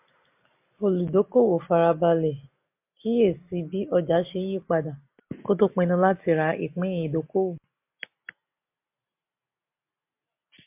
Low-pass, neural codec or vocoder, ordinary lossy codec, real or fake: 3.6 kHz; none; MP3, 32 kbps; real